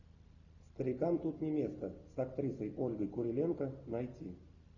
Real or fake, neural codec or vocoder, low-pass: real; none; 7.2 kHz